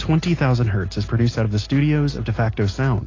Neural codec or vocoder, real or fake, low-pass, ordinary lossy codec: none; real; 7.2 kHz; AAC, 32 kbps